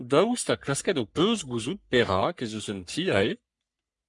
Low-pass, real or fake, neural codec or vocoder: 10.8 kHz; fake; codec, 44.1 kHz, 3.4 kbps, Pupu-Codec